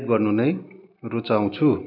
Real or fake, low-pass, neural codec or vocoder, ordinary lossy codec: real; 5.4 kHz; none; none